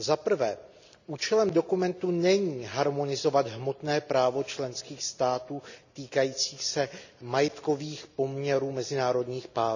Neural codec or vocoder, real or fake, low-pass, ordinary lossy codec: none; real; 7.2 kHz; none